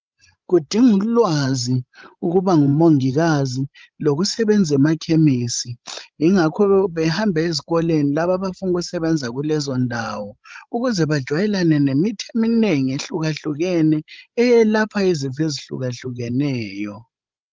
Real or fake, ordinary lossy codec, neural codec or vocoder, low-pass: fake; Opus, 24 kbps; codec, 16 kHz, 16 kbps, FreqCodec, larger model; 7.2 kHz